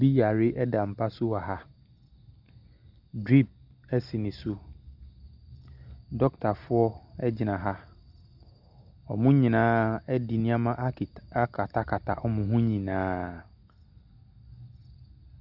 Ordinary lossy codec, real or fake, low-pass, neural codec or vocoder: Opus, 64 kbps; real; 5.4 kHz; none